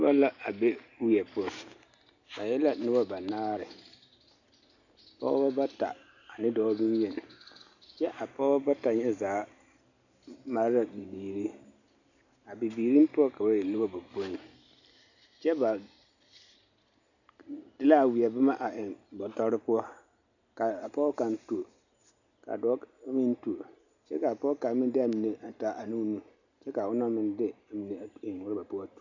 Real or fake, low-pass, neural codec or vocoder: real; 7.2 kHz; none